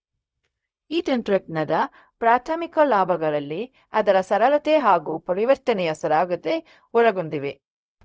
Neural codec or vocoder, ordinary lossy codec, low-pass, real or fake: codec, 16 kHz, 0.4 kbps, LongCat-Audio-Codec; none; none; fake